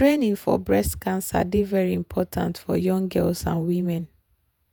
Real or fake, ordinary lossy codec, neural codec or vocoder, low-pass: fake; none; vocoder, 48 kHz, 128 mel bands, Vocos; none